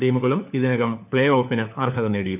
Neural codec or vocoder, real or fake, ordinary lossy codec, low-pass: codec, 16 kHz, 4 kbps, FunCodec, trained on LibriTTS, 50 frames a second; fake; none; 3.6 kHz